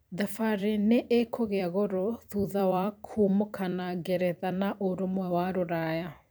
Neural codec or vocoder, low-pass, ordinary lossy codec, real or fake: vocoder, 44.1 kHz, 128 mel bands every 256 samples, BigVGAN v2; none; none; fake